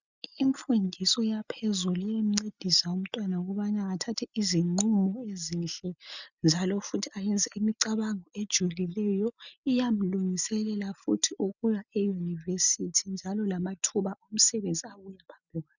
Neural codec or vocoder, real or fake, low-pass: none; real; 7.2 kHz